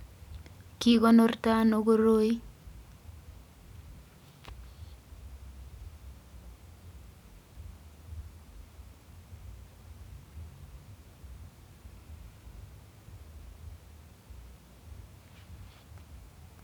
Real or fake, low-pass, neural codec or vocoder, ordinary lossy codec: fake; 19.8 kHz; vocoder, 44.1 kHz, 128 mel bands every 512 samples, BigVGAN v2; none